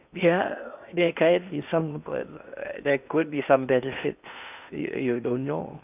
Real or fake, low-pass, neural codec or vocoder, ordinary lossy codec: fake; 3.6 kHz; codec, 16 kHz in and 24 kHz out, 0.8 kbps, FocalCodec, streaming, 65536 codes; none